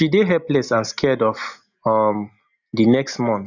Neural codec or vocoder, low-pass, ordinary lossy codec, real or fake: none; 7.2 kHz; none; real